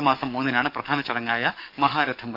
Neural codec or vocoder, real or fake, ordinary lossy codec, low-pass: codec, 44.1 kHz, 7.8 kbps, DAC; fake; none; 5.4 kHz